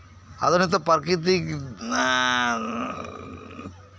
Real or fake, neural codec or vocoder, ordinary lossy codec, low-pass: real; none; none; none